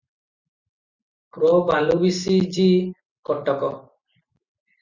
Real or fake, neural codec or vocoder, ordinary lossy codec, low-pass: real; none; Opus, 64 kbps; 7.2 kHz